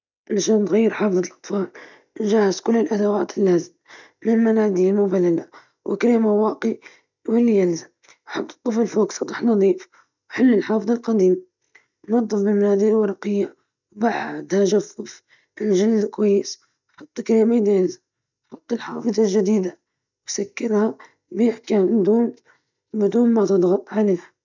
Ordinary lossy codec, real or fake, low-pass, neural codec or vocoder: none; real; 7.2 kHz; none